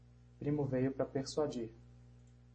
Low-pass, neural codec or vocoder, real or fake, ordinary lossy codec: 9.9 kHz; none; real; MP3, 32 kbps